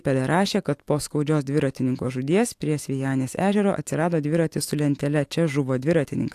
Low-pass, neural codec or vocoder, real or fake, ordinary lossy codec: 14.4 kHz; autoencoder, 48 kHz, 128 numbers a frame, DAC-VAE, trained on Japanese speech; fake; AAC, 64 kbps